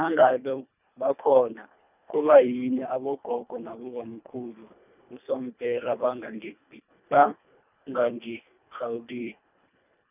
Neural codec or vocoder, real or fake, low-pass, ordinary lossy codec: codec, 24 kHz, 1.5 kbps, HILCodec; fake; 3.6 kHz; none